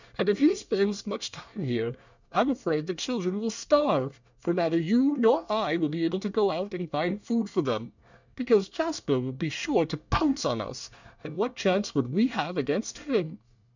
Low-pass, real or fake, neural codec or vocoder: 7.2 kHz; fake; codec, 24 kHz, 1 kbps, SNAC